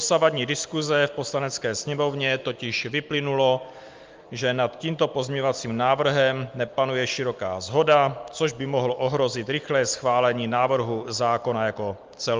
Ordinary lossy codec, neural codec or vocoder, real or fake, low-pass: Opus, 24 kbps; none; real; 7.2 kHz